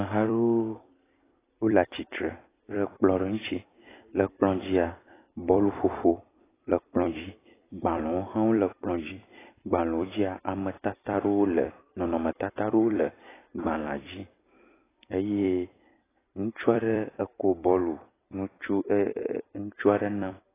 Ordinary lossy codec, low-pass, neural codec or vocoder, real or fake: AAC, 16 kbps; 3.6 kHz; vocoder, 44.1 kHz, 128 mel bands every 256 samples, BigVGAN v2; fake